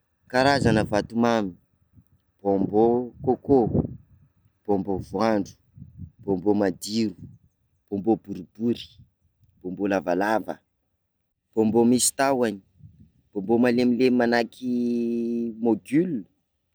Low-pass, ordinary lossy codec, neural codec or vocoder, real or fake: none; none; none; real